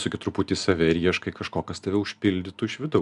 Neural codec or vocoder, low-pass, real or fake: none; 10.8 kHz; real